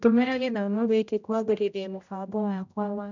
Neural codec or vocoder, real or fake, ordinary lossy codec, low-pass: codec, 16 kHz, 0.5 kbps, X-Codec, HuBERT features, trained on general audio; fake; none; 7.2 kHz